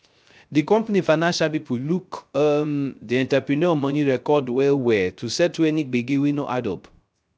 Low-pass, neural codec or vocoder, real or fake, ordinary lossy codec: none; codec, 16 kHz, 0.3 kbps, FocalCodec; fake; none